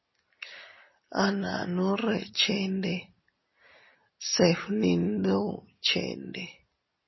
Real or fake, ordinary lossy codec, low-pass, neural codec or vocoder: real; MP3, 24 kbps; 7.2 kHz; none